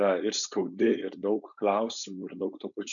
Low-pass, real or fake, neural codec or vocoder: 7.2 kHz; fake; codec, 16 kHz, 4.8 kbps, FACodec